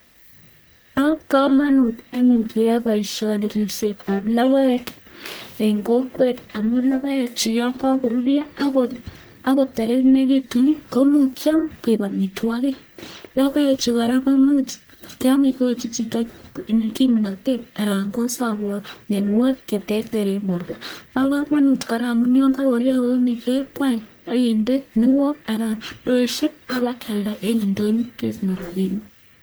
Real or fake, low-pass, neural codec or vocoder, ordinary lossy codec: fake; none; codec, 44.1 kHz, 1.7 kbps, Pupu-Codec; none